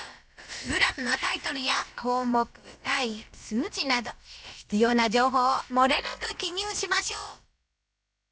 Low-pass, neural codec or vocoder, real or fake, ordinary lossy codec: none; codec, 16 kHz, about 1 kbps, DyCAST, with the encoder's durations; fake; none